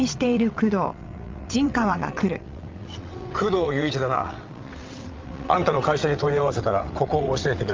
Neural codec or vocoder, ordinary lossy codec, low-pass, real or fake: vocoder, 22.05 kHz, 80 mel bands, WaveNeXt; Opus, 32 kbps; 7.2 kHz; fake